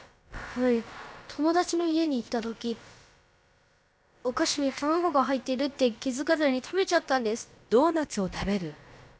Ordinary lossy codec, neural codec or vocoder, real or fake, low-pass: none; codec, 16 kHz, about 1 kbps, DyCAST, with the encoder's durations; fake; none